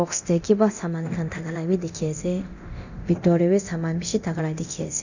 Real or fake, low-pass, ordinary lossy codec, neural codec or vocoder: fake; 7.2 kHz; none; codec, 24 kHz, 0.9 kbps, DualCodec